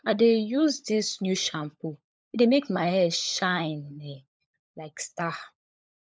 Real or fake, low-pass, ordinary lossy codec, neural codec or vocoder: fake; none; none; codec, 16 kHz, 16 kbps, FunCodec, trained on LibriTTS, 50 frames a second